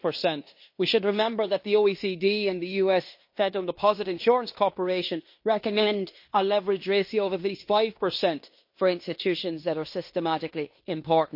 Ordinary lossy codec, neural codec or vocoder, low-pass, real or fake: MP3, 32 kbps; codec, 16 kHz in and 24 kHz out, 0.9 kbps, LongCat-Audio-Codec, fine tuned four codebook decoder; 5.4 kHz; fake